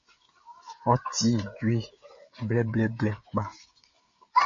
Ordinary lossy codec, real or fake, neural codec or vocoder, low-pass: MP3, 32 kbps; fake; codec, 16 kHz, 16 kbps, FreqCodec, smaller model; 7.2 kHz